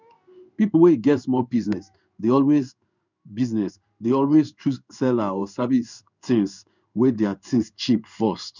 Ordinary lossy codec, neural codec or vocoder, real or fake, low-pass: none; codec, 16 kHz in and 24 kHz out, 1 kbps, XY-Tokenizer; fake; 7.2 kHz